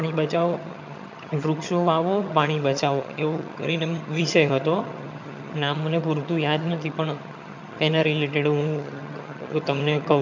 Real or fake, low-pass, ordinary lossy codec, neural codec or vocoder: fake; 7.2 kHz; MP3, 64 kbps; vocoder, 22.05 kHz, 80 mel bands, HiFi-GAN